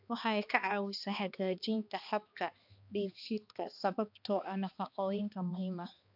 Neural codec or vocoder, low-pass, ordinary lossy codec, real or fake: codec, 16 kHz, 2 kbps, X-Codec, HuBERT features, trained on balanced general audio; 5.4 kHz; AAC, 48 kbps; fake